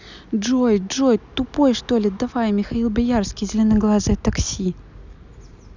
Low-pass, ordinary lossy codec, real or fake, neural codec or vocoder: 7.2 kHz; none; real; none